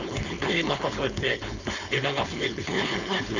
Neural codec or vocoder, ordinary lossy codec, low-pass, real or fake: codec, 16 kHz, 4.8 kbps, FACodec; none; 7.2 kHz; fake